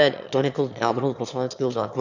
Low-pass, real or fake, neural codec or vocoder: 7.2 kHz; fake; autoencoder, 22.05 kHz, a latent of 192 numbers a frame, VITS, trained on one speaker